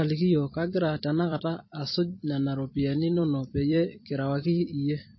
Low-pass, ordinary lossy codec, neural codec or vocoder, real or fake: 7.2 kHz; MP3, 24 kbps; vocoder, 44.1 kHz, 128 mel bands every 256 samples, BigVGAN v2; fake